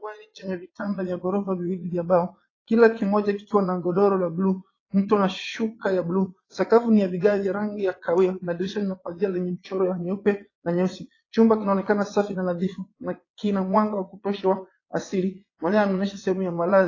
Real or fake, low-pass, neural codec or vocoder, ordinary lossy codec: fake; 7.2 kHz; vocoder, 22.05 kHz, 80 mel bands, Vocos; AAC, 32 kbps